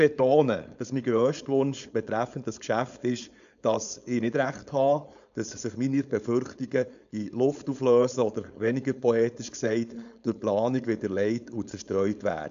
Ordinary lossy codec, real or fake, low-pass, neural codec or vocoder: AAC, 96 kbps; fake; 7.2 kHz; codec, 16 kHz, 4.8 kbps, FACodec